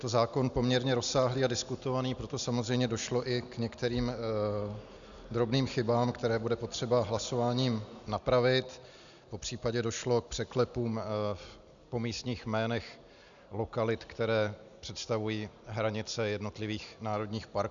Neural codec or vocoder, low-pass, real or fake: none; 7.2 kHz; real